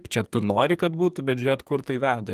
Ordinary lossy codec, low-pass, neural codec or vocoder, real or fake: Opus, 32 kbps; 14.4 kHz; codec, 44.1 kHz, 2.6 kbps, SNAC; fake